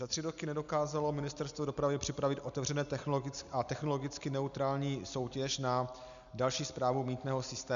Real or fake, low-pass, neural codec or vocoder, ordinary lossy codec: real; 7.2 kHz; none; MP3, 96 kbps